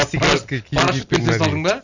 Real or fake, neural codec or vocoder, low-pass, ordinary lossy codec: real; none; 7.2 kHz; none